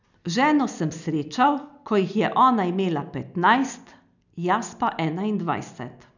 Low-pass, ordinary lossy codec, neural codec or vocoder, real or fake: 7.2 kHz; none; none; real